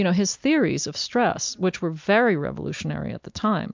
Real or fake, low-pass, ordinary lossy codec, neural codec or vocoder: real; 7.2 kHz; MP3, 64 kbps; none